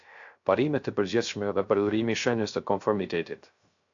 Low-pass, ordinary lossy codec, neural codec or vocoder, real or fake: 7.2 kHz; AAC, 64 kbps; codec, 16 kHz, 0.3 kbps, FocalCodec; fake